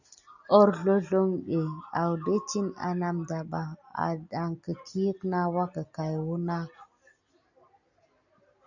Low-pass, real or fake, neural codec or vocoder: 7.2 kHz; real; none